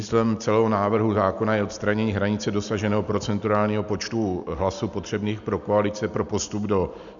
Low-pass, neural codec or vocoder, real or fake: 7.2 kHz; none; real